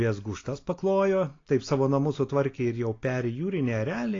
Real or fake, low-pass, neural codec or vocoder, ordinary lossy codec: real; 7.2 kHz; none; AAC, 32 kbps